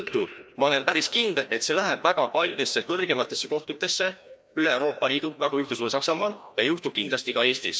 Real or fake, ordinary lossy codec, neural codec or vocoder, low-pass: fake; none; codec, 16 kHz, 1 kbps, FreqCodec, larger model; none